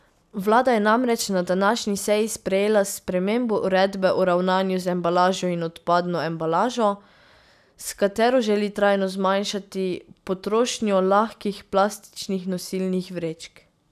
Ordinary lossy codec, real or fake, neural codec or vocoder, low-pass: none; real; none; 14.4 kHz